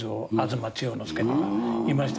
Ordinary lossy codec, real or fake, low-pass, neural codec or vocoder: none; real; none; none